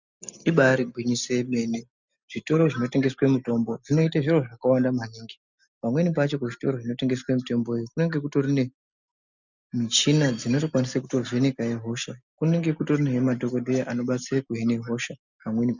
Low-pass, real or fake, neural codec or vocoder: 7.2 kHz; real; none